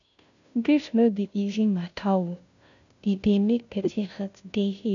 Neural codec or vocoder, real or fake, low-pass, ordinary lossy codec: codec, 16 kHz, 0.5 kbps, FunCodec, trained on Chinese and English, 25 frames a second; fake; 7.2 kHz; none